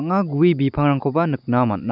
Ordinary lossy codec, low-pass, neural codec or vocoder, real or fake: none; 5.4 kHz; none; real